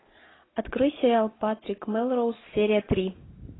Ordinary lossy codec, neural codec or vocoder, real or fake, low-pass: AAC, 16 kbps; none; real; 7.2 kHz